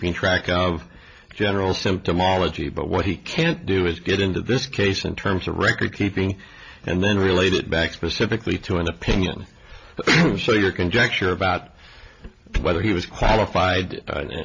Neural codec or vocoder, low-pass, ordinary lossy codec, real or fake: none; 7.2 kHz; AAC, 48 kbps; real